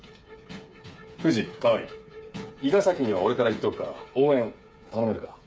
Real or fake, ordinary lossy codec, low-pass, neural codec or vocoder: fake; none; none; codec, 16 kHz, 8 kbps, FreqCodec, smaller model